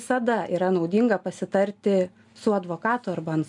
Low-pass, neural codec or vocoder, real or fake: 10.8 kHz; none; real